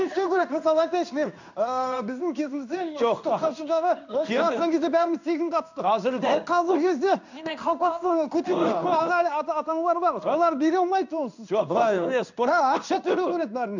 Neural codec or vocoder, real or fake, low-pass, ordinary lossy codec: codec, 16 kHz in and 24 kHz out, 1 kbps, XY-Tokenizer; fake; 7.2 kHz; none